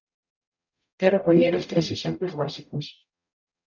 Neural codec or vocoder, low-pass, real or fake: codec, 44.1 kHz, 0.9 kbps, DAC; 7.2 kHz; fake